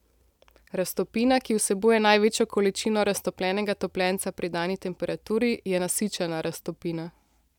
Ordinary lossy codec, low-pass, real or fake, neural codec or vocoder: none; 19.8 kHz; real; none